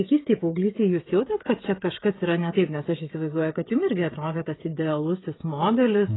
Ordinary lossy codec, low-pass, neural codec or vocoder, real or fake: AAC, 16 kbps; 7.2 kHz; codec, 16 kHz, 16 kbps, FreqCodec, smaller model; fake